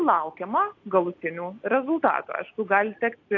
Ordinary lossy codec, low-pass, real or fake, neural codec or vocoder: AAC, 48 kbps; 7.2 kHz; real; none